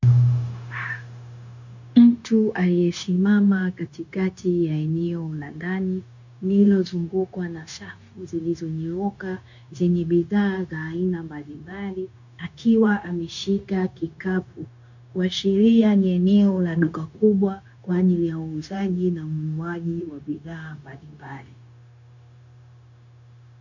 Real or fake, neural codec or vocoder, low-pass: fake; codec, 16 kHz, 0.9 kbps, LongCat-Audio-Codec; 7.2 kHz